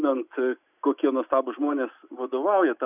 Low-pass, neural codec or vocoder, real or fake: 3.6 kHz; none; real